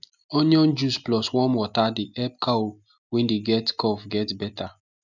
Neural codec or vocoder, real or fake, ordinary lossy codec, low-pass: none; real; none; 7.2 kHz